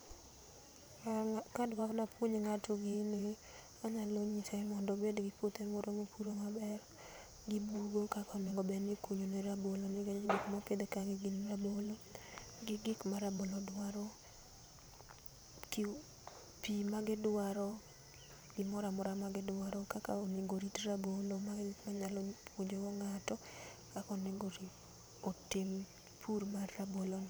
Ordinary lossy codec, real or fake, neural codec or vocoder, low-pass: none; fake; vocoder, 44.1 kHz, 128 mel bands every 512 samples, BigVGAN v2; none